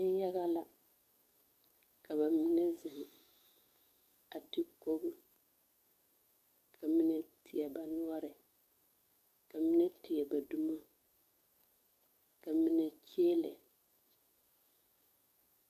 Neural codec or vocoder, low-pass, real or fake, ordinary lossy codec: codec, 44.1 kHz, 7.8 kbps, DAC; 14.4 kHz; fake; MP3, 96 kbps